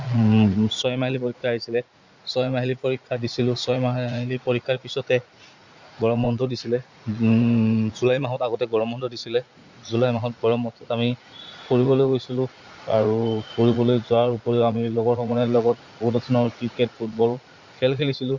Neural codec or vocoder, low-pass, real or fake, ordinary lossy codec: vocoder, 44.1 kHz, 80 mel bands, Vocos; 7.2 kHz; fake; Opus, 64 kbps